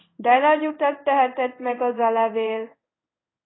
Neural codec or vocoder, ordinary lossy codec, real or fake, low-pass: codec, 16 kHz in and 24 kHz out, 1 kbps, XY-Tokenizer; AAC, 16 kbps; fake; 7.2 kHz